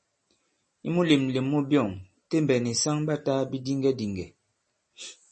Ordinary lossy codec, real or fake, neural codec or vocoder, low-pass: MP3, 32 kbps; real; none; 9.9 kHz